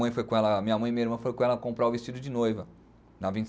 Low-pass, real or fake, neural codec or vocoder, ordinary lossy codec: none; real; none; none